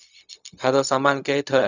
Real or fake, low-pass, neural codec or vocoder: fake; 7.2 kHz; codec, 16 kHz, 0.4 kbps, LongCat-Audio-Codec